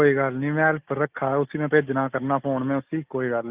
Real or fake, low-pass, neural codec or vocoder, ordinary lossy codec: real; 3.6 kHz; none; Opus, 32 kbps